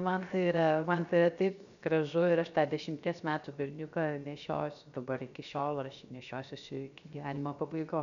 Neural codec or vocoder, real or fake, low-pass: codec, 16 kHz, 0.7 kbps, FocalCodec; fake; 7.2 kHz